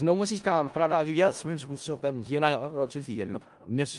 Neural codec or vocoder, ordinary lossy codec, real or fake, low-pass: codec, 16 kHz in and 24 kHz out, 0.4 kbps, LongCat-Audio-Codec, four codebook decoder; Opus, 32 kbps; fake; 10.8 kHz